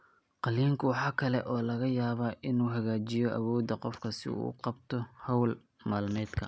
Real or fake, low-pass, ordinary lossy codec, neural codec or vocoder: real; none; none; none